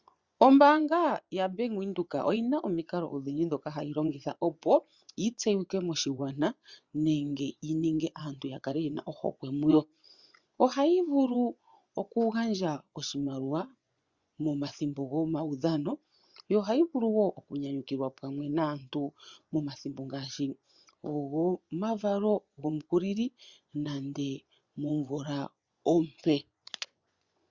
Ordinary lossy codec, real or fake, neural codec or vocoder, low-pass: Opus, 64 kbps; fake; vocoder, 24 kHz, 100 mel bands, Vocos; 7.2 kHz